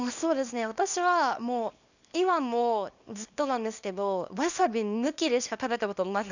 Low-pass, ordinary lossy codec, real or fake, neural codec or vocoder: 7.2 kHz; none; fake; codec, 24 kHz, 0.9 kbps, WavTokenizer, small release